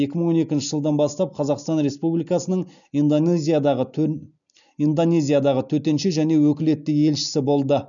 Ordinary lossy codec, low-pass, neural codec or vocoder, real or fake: none; 7.2 kHz; none; real